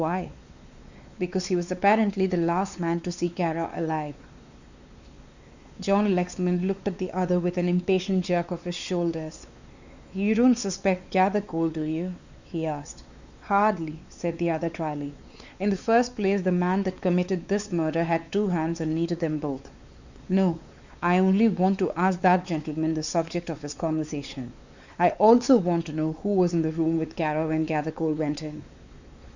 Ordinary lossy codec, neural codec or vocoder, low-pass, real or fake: Opus, 64 kbps; codec, 16 kHz, 4 kbps, X-Codec, WavLM features, trained on Multilingual LibriSpeech; 7.2 kHz; fake